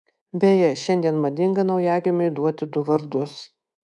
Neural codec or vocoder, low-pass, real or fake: codec, 24 kHz, 3.1 kbps, DualCodec; 10.8 kHz; fake